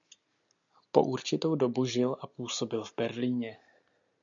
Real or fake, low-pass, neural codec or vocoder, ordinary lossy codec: real; 7.2 kHz; none; MP3, 96 kbps